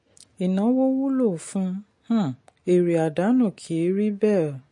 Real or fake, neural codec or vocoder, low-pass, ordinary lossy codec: real; none; 10.8 kHz; MP3, 48 kbps